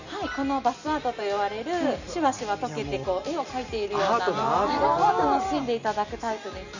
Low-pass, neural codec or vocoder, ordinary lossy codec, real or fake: 7.2 kHz; none; none; real